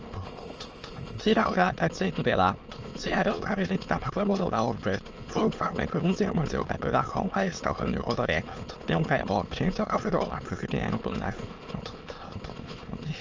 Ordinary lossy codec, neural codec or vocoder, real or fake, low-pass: Opus, 24 kbps; autoencoder, 22.05 kHz, a latent of 192 numbers a frame, VITS, trained on many speakers; fake; 7.2 kHz